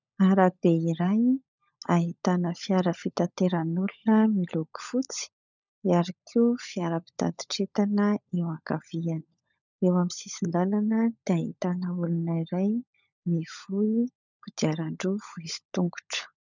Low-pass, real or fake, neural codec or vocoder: 7.2 kHz; fake; codec, 16 kHz, 16 kbps, FunCodec, trained on LibriTTS, 50 frames a second